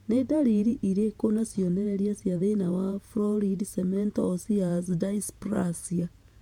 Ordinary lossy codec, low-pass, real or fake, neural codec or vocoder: MP3, 96 kbps; 19.8 kHz; fake; vocoder, 48 kHz, 128 mel bands, Vocos